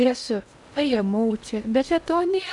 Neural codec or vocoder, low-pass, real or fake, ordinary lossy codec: codec, 16 kHz in and 24 kHz out, 0.6 kbps, FocalCodec, streaming, 2048 codes; 10.8 kHz; fake; MP3, 96 kbps